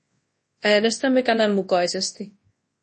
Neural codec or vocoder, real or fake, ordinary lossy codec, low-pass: codec, 24 kHz, 0.9 kbps, WavTokenizer, large speech release; fake; MP3, 32 kbps; 10.8 kHz